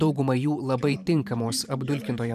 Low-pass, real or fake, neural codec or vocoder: 14.4 kHz; fake; vocoder, 44.1 kHz, 128 mel bands every 512 samples, BigVGAN v2